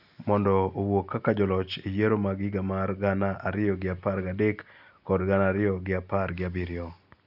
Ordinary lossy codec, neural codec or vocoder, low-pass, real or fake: none; none; 5.4 kHz; real